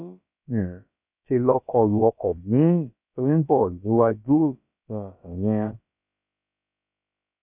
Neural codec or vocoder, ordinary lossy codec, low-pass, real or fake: codec, 16 kHz, about 1 kbps, DyCAST, with the encoder's durations; none; 3.6 kHz; fake